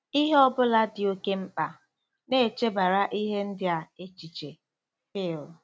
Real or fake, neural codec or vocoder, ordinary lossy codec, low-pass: real; none; none; none